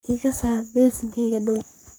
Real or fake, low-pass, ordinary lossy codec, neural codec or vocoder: fake; none; none; codec, 44.1 kHz, 3.4 kbps, Pupu-Codec